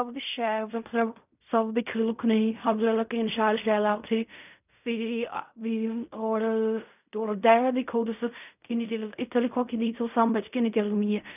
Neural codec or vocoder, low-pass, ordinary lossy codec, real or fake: codec, 16 kHz in and 24 kHz out, 0.4 kbps, LongCat-Audio-Codec, fine tuned four codebook decoder; 3.6 kHz; none; fake